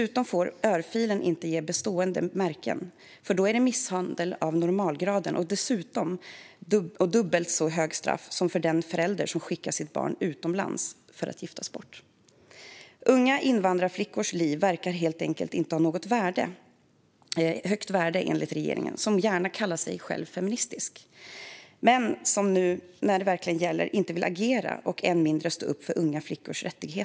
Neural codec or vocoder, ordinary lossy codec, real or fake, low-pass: none; none; real; none